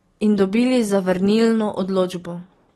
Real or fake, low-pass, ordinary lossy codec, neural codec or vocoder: real; 19.8 kHz; AAC, 32 kbps; none